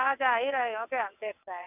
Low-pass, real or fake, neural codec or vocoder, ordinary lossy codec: 3.6 kHz; fake; vocoder, 22.05 kHz, 80 mel bands, WaveNeXt; none